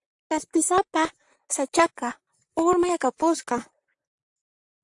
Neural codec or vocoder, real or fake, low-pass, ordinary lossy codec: vocoder, 44.1 kHz, 128 mel bands, Pupu-Vocoder; fake; 10.8 kHz; AAC, 64 kbps